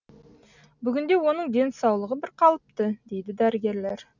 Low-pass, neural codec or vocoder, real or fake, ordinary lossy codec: 7.2 kHz; none; real; none